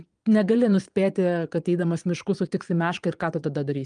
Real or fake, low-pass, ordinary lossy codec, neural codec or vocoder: fake; 9.9 kHz; Opus, 32 kbps; vocoder, 22.05 kHz, 80 mel bands, Vocos